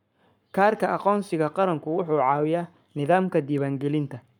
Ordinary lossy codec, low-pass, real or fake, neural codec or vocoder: none; 19.8 kHz; fake; codec, 44.1 kHz, 7.8 kbps, Pupu-Codec